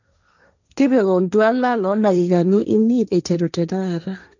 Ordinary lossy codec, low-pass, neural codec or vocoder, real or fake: none; 7.2 kHz; codec, 16 kHz, 1.1 kbps, Voila-Tokenizer; fake